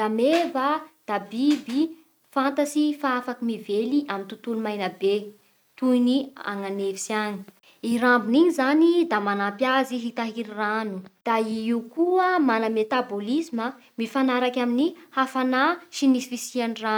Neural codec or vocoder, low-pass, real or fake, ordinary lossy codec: none; none; real; none